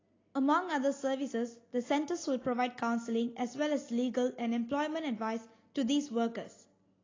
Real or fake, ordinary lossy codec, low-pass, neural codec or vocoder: real; AAC, 32 kbps; 7.2 kHz; none